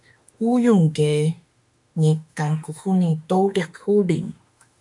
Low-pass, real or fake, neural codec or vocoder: 10.8 kHz; fake; autoencoder, 48 kHz, 32 numbers a frame, DAC-VAE, trained on Japanese speech